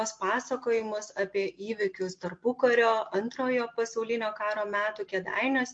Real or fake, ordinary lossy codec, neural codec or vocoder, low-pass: real; MP3, 48 kbps; none; 9.9 kHz